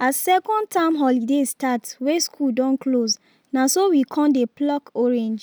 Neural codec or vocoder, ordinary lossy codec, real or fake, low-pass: none; none; real; none